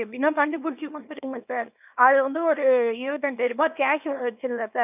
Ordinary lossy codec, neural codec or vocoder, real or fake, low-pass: none; codec, 24 kHz, 0.9 kbps, WavTokenizer, small release; fake; 3.6 kHz